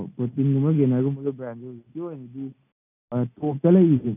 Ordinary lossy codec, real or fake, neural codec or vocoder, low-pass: none; real; none; 3.6 kHz